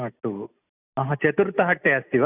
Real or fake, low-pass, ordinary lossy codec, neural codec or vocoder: real; 3.6 kHz; none; none